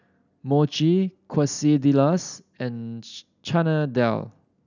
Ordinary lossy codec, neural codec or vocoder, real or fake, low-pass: none; none; real; 7.2 kHz